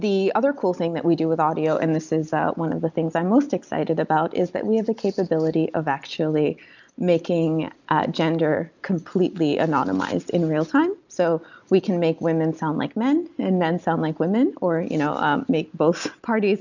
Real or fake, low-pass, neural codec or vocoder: real; 7.2 kHz; none